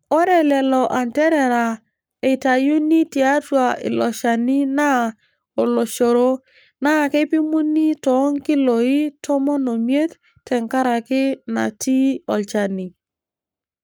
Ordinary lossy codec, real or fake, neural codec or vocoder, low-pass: none; fake; codec, 44.1 kHz, 7.8 kbps, Pupu-Codec; none